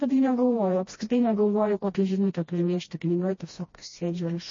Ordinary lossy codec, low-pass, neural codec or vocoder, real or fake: MP3, 32 kbps; 7.2 kHz; codec, 16 kHz, 1 kbps, FreqCodec, smaller model; fake